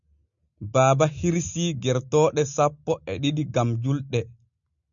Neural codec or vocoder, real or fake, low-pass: none; real; 7.2 kHz